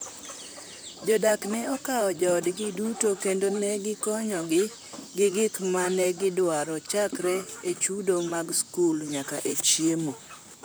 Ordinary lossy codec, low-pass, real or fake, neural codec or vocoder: none; none; fake; vocoder, 44.1 kHz, 128 mel bands, Pupu-Vocoder